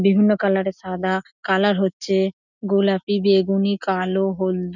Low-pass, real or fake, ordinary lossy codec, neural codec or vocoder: 7.2 kHz; real; none; none